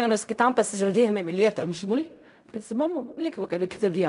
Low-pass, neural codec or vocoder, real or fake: 10.8 kHz; codec, 16 kHz in and 24 kHz out, 0.4 kbps, LongCat-Audio-Codec, fine tuned four codebook decoder; fake